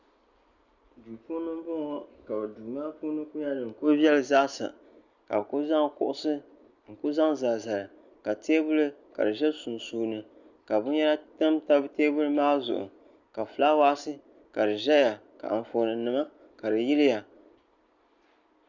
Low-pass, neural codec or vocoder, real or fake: 7.2 kHz; none; real